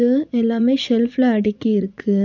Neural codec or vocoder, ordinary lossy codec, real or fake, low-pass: vocoder, 44.1 kHz, 80 mel bands, Vocos; none; fake; 7.2 kHz